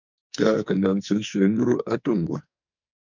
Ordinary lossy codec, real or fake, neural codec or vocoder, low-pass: MP3, 64 kbps; fake; codec, 44.1 kHz, 2.6 kbps, SNAC; 7.2 kHz